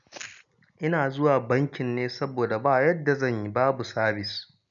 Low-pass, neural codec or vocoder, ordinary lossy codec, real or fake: 7.2 kHz; none; none; real